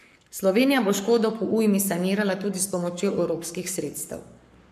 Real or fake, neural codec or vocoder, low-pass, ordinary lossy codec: fake; codec, 44.1 kHz, 7.8 kbps, Pupu-Codec; 14.4 kHz; MP3, 96 kbps